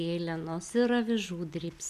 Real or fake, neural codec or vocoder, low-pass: real; none; 14.4 kHz